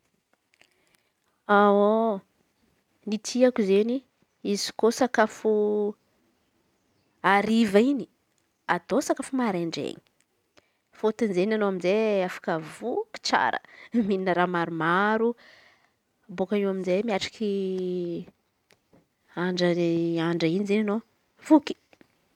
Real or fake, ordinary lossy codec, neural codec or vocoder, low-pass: real; none; none; 19.8 kHz